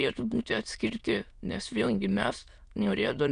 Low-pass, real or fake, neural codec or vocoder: 9.9 kHz; fake; autoencoder, 22.05 kHz, a latent of 192 numbers a frame, VITS, trained on many speakers